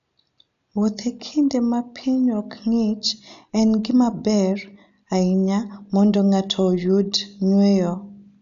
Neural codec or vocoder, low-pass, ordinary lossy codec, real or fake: none; 7.2 kHz; none; real